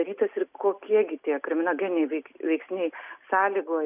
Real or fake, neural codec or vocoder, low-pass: real; none; 3.6 kHz